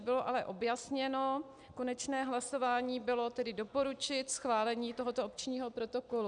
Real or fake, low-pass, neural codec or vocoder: real; 9.9 kHz; none